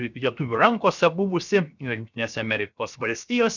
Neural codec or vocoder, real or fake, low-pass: codec, 16 kHz, 0.7 kbps, FocalCodec; fake; 7.2 kHz